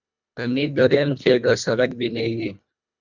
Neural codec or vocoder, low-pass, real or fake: codec, 24 kHz, 1.5 kbps, HILCodec; 7.2 kHz; fake